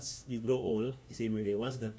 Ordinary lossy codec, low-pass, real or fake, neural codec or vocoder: none; none; fake; codec, 16 kHz, 1 kbps, FunCodec, trained on LibriTTS, 50 frames a second